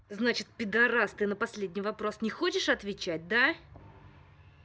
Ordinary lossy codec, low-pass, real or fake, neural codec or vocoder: none; none; real; none